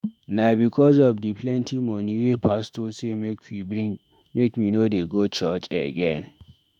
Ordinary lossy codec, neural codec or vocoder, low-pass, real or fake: none; autoencoder, 48 kHz, 32 numbers a frame, DAC-VAE, trained on Japanese speech; 19.8 kHz; fake